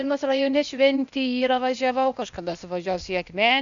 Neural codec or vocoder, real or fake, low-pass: codec, 16 kHz, 0.8 kbps, ZipCodec; fake; 7.2 kHz